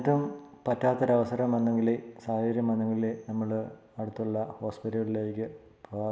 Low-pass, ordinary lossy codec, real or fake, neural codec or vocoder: none; none; real; none